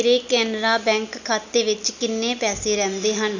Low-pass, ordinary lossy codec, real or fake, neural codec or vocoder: 7.2 kHz; none; real; none